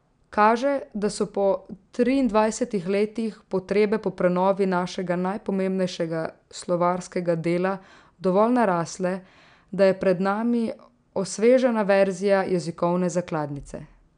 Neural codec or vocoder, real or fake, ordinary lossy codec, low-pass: none; real; none; 9.9 kHz